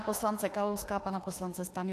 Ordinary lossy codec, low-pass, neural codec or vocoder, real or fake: AAC, 64 kbps; 14.4 kHz; autoencoder, 48 kHz, 32 numbers a frame, DAC-VAE, trained on Japanese speech; fake